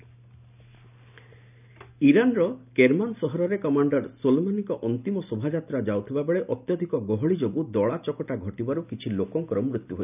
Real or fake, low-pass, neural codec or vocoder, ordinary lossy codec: real; 3.6 kHz; none; none